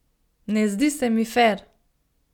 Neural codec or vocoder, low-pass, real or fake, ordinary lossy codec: none; 19.8 kHz; real; none